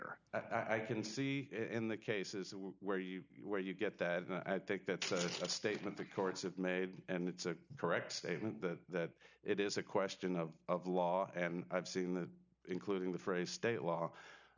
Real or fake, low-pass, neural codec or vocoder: real; 7.2 kHz; none